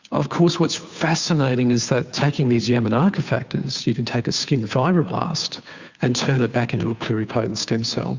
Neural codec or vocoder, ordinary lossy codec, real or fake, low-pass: codec, 16 kHz, 2 kbps, FunCodec, trained on Chinese and English, 25 frames a second; Opus, 64 kbps; fake; 7.2 kHz